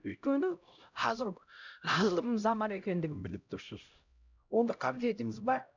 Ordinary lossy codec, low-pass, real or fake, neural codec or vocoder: none; 7.2 kHz; fake; codec, 16 kHz, 0.5 kbps, X-Codec, HuBERT features, trained on LibriSpeech